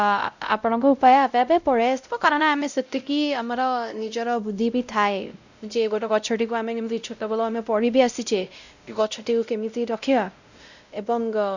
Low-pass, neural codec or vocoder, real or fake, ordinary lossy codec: 7.2 kHz; codec, 16 kHz, 0.5 kbps, X-Codec, WavLM features, trained on Multilingual LibriSpeech; fake; none